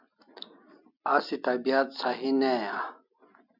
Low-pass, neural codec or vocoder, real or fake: 5.4 kHz; none; real